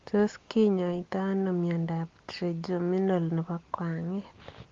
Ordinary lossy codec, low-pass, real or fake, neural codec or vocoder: Opus, 32 kbps; 7.2 kHz; real; none